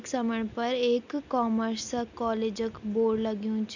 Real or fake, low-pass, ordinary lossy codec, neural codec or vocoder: real; 7.2 kHz; none; none